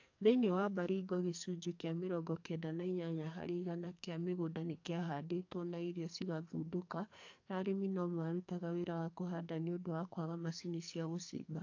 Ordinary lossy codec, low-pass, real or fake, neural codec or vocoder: AAC, 48 kbps; 7.2 kHz; fake; codec, 44.1 kHz, 2.6 kbps, SNAC